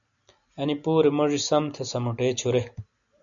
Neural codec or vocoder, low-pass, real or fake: none; 7.2 kHz; real